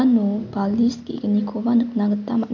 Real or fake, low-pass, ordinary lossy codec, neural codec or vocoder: real; 7.2 kHz; none; none